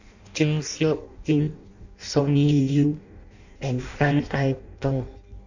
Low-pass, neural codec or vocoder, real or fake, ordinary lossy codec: 7.2 kHz; codec, 16 kHz in and 24 kHz out, 0.6 kbps, FireRedTTS-2 codec; fake; none